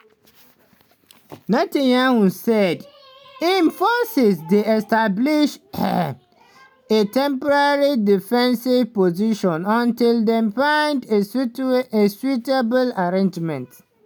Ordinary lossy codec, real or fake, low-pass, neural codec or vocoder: none; real; none; none